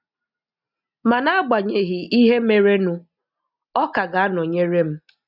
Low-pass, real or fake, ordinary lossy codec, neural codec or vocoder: 5.4 kHz; real; none; none